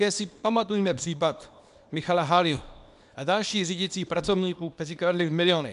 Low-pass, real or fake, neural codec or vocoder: 10.8 kHz; fake; codec, 24 kHz, 0.9 kbps, WavTokenizer, small release